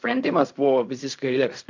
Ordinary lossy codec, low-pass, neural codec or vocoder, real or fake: MP3, 64 kbps; 7.2 kHz; codec, 16 kHz in and 24 kHz out, 0.4 kbps, LongCat-Audio-Codec, fine tuned four codebook decoder; fake